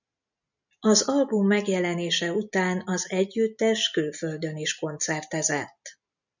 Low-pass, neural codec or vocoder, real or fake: 7.2 kHz; none; real